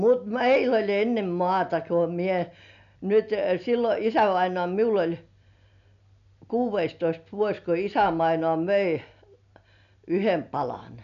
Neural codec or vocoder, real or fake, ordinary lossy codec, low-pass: none; real; none; 7.2 kHz